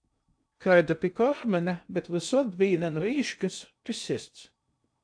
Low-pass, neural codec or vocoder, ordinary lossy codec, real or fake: 9.9 kHz; codec, 16 kHz in and 24 kHz out, 0.6 kbps, FocalCodec, streaming, 2048 codes; AAC, 64 kbps; fake